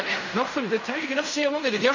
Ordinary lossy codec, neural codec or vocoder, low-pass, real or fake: none; codec, 16 kHz in and 24 kHz out, 0.4 kbps, LongCat-Audio-Codec, fine tuned four codebook decoder; 7.2 kHz; fake